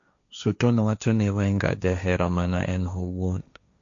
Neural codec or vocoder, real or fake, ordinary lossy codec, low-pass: codec, 16 kHz, 1.1 kbps, Voila-Tokenizer; fake; MP3, 64 kbps; 7.2 kHz